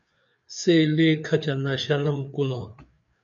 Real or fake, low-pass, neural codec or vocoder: fake; 7.2 kHz; codec, 16 kHz, 4 kbps, FreqCodec, larger model